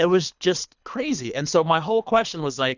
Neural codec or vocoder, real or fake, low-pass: codec, 24 kHz, 3 kbps, HILCodec; fake; 7.2 kHz